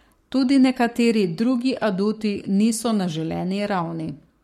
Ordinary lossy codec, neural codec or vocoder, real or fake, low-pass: MP3, 64 kbps; codec, 44.1 kHz, 7.8 kbps, Pupu-Codec; fake; 19.8 kHz